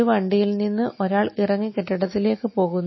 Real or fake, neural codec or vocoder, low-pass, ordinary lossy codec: real; none; 7.2 kHz; MP3, 24 kbps